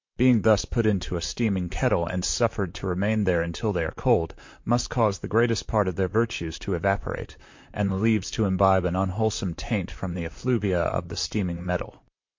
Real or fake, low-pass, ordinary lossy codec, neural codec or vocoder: fake; 7.2 kHz; MP3, 48 kbps; vocoder, 44.1 kHz, 128 mel bands, Pupu-Vocoder